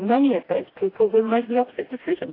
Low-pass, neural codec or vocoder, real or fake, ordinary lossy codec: 5.4 kHz; codec, 16 kHz, 1 kbps, FreqCodec, smaller model; fake; AAC, 24 kbps